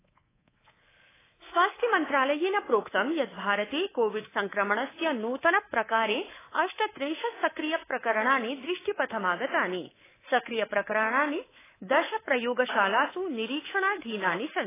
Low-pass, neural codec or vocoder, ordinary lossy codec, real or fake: 3.6 kHz; codec, 24 kHz, 3.1 kbps, DualCodec; AAC, 16 kbps; fake